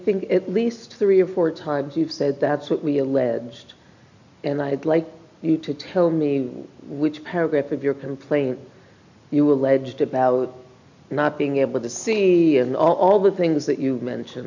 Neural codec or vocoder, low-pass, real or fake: none; 7.2 kHz; real